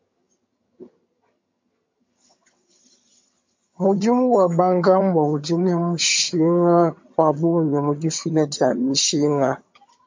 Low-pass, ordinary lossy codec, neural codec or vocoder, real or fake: 7.2 kHz; MP3, 48 kbps; vocoder, 22.05 kHz, 80 mel bands, HiFi-GAN; fake